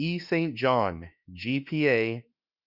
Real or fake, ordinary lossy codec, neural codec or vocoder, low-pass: fake; Opus, 64 kbps; autoencoder, 48 kHz, 32 numbers a frame, DAC-VAE, trained on Japanese speech; 5.4 kHz